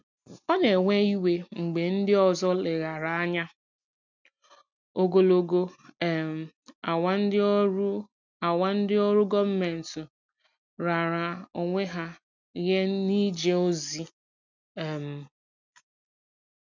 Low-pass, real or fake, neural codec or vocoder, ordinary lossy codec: 7.2 kHz; real; none; none